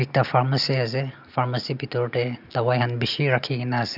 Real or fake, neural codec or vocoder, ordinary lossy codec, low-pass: real; none; none; 5.4 kHz